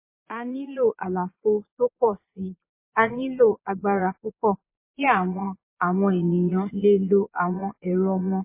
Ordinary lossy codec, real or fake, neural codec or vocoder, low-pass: MP3, 24 kbps; fake; vocoder, 22.05 kHz, 80 mel bands, Vocos; 3.6 kHz